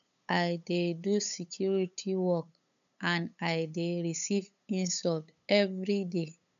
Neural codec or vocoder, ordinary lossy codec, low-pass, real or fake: codec, 16 kHz, 8 kbps, FunCodec, trained on LibriTTS, 25 frames a second; none; 7.2 kHz; fake